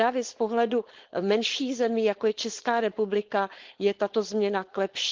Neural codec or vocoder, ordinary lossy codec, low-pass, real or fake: codec, 16 kHz, 4.8 kbps, FACodec; Opus, 16 kbps; 7.2 kHz; fake